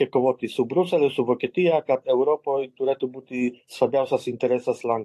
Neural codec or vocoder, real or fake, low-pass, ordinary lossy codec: vocoder, 44.1 kHz, 128 mel bands every 512 samples, BigVGAN v2; fake; 14.4 kHz; AAC, 48 kbps